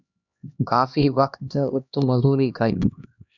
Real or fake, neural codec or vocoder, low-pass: fake; codec, 16 kHz, 1 kbps, X-Codec, HuBERT features, trained on LibriSpeech; 7.2 kHz